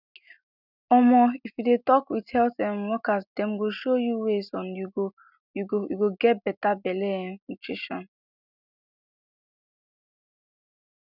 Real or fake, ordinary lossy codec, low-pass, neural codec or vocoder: real; none; 5.4 kHz; none